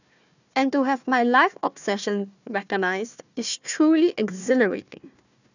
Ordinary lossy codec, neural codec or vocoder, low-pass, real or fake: none; codec, 16 kHz, 1 kbps, FunCodec, trained on Chinese and English, 50 frames a second; 7.2 kHz; fake